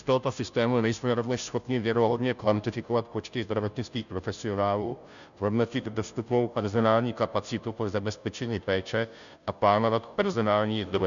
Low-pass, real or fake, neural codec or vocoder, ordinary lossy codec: 7.2 kHz; fake; codec, 16 kHz, 0.5 kbps, FunCodec, trained on Chinese and English, 25 frames a second; MP3, 96 kbps